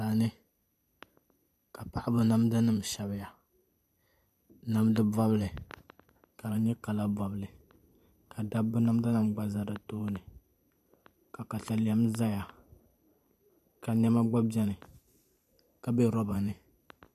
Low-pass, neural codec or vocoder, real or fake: 14.4 kHz; vocoder, 44.1 kHz, 128 mel bands every 512 samples, BigVGAN v2; fake